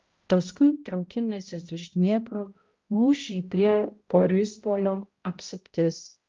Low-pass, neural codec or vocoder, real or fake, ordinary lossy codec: 7.2 kHz; codec, 16 kHz, 0.5 kbps, X-Codec, HuBERT features, trained on balanced general audio; fake; Opus, 32 kbps